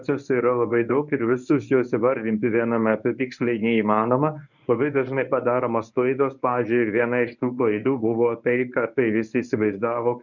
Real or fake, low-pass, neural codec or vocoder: fake; 7.2 kHz; codec, 24 kHz, 0.9 kbps, WavTokenizer, medium speech release version 1